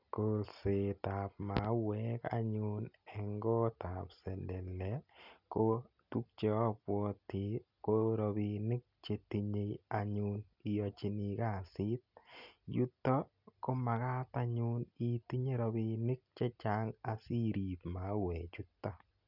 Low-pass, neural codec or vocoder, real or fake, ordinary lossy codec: 5.4 kHz; none; real; none